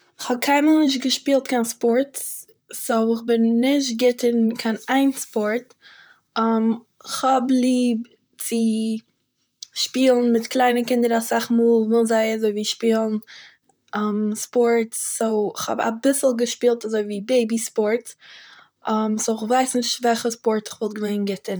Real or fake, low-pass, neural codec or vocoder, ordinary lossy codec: fake; none; vocoder, 44.1 kHz, 128 mel bands, Pupu-Vocoder; none